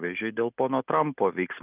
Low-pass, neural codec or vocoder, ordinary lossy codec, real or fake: 3.6 kHz; none; Opus, 24 kbps; real